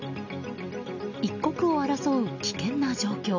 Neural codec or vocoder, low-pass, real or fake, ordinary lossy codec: none; 7.2 kHz; real; none